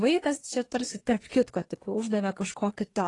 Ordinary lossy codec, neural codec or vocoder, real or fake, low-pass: AAC, 32 kbps; codec, 24 kHz, 1 kbps, SNAC; fake; 10.8 kHz